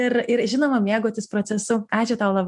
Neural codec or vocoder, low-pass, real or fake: none; 10.8 kHz; real